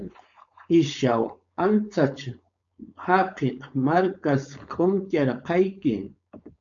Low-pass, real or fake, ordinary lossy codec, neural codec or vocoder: 7.2 kHz; fake; MP3, 64 kbps; codec, 16 kHz, 4.8 kbps, FACodec